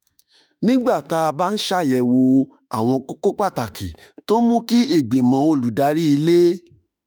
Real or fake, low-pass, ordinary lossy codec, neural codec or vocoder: fake; none; none; autoencoder, 48 kHz, 32 numbers a frame, DAC-VAE, trained on Japanese speech